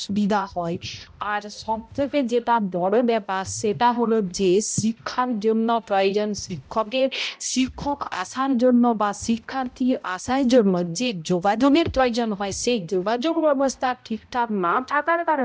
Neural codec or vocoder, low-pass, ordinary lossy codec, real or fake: codec, 16 kHz, 0.5 kbps, X-Codec, HuBERT features, trained on balanced general audio; none; none; fake